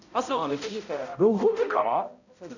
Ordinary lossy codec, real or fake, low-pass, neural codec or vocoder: none; fake; 7.2 kHz; codec, 16 kHz, 0.5 kbps, X-Codec, HuBERT features, trained on balanced general audio